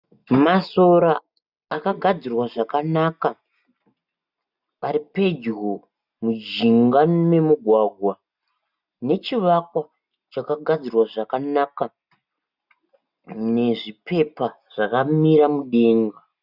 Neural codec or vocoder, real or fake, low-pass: none; real; 5.4 kHz